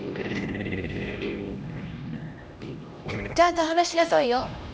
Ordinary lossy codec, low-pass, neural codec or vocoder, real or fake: none; none; codec, 16 kHz, 1 kbps, X-Codec, HuBERT features, trained on LibriSpeech; fake